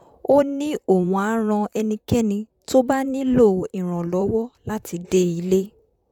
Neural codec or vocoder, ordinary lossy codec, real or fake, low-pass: vocoder, 44.1 kHz, 128 mel bands, Pupu-Vocoder; none; fake; 19.8 kHz